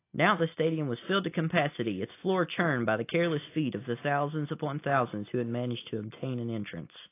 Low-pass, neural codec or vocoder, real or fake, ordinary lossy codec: 3.6 kHz; none; real; AAC, 24 kbps